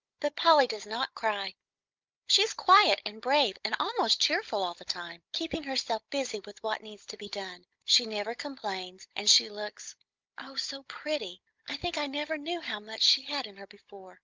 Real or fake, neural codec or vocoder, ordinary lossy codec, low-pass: fake; codec, 16 kHz, 16 kbps, FunCodec, trained on Chinese and English, 50 frames a second; Opus, 24 kbps; 7.2 kHz